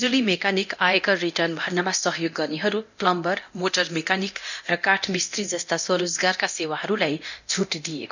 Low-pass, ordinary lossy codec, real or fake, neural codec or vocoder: 7.2 kHz; none; fake; codec, 24 kHz, 0.9 kbps, DualCodec